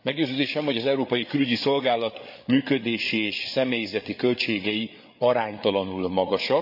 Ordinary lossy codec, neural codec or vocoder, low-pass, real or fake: none; codec, 16 kHz, 8 kbps, FreqCodec, larger model; 5.4 kHz; fake